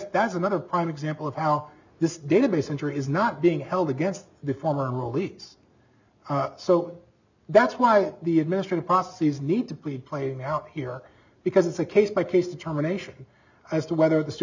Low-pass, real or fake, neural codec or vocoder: 7.2 kHz; real; none